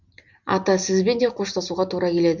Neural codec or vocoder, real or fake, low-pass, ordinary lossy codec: none; real; 7.2 kHz; none